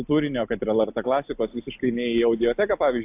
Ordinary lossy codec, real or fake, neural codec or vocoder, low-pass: AAC, 32 kbps; real; none; 3.6 kHz